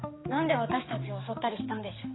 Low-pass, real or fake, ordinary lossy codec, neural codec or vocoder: 7.2 kHz; fake; AAC, 16 kbps; codec, 16 kHz, 4 kbps, X-Codec, HuBERT features, trained on general audio